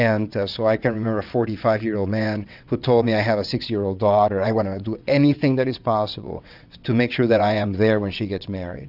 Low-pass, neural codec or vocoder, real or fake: 5.4 kHz; vocoder, 22.05 kHz, 80 mel bands, WaveNeXt; fake